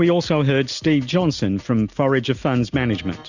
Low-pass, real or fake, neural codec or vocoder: 7.2 kHz; real; none